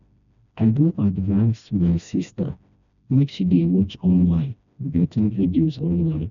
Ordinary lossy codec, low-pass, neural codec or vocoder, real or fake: none; 7.2 kHz; codec, 16 kHz, 1 kbps, FreqCodec, smaller model; fake